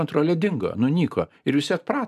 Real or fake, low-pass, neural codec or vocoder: fake; 14.4 kHz; vocoder, 44.1 kHz, 128 mel bands every 512 samples, BigVGAN v2